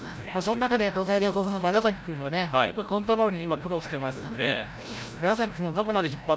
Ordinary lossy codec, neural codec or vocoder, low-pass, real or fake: none; codec, 16 kHz, 0.5 kbps, FreqCodec, larger model; none; fake